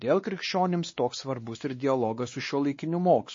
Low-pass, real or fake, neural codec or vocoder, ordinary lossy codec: 7.2 kHz; fake; codec, 16 kHz, 2 kbps, X-Codec, WavLM features, trained on Multilingual LibriSpeech; MP3, 32 kbps